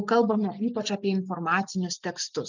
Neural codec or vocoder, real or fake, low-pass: none; real; 7.2 kHz